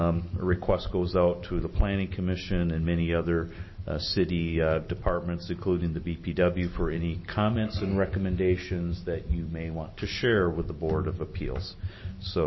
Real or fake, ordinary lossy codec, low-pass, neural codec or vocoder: real; MP3, 24 kbps; 7.2 kHz; none